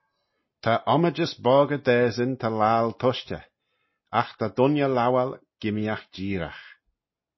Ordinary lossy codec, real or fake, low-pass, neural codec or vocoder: MP3, 24 kbps; real; 7.2 kHz; none